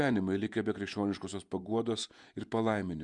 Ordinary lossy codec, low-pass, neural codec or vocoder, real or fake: Opus, 64 kbps; 10.8 kHz; vocoder, 48 kHz, 128 mel bands, Vocos; fake